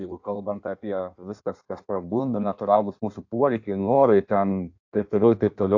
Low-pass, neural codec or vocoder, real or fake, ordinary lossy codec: 7.2 kHz; codec, 16 kHz in and 24 kHz out, 1.1 kbps, FireRedTTS-2 codec; fake; AAC, 48 kbps